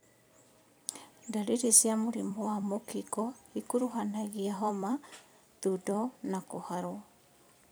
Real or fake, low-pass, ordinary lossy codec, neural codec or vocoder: fake; none; none; vocoder, 44.1 kHz, 128 mel bands every 256 samples, BigVGAN v2